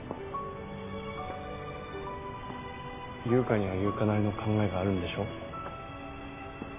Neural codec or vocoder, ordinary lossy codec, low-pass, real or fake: none; MP3, 16 kbps; 3.6 kHz; real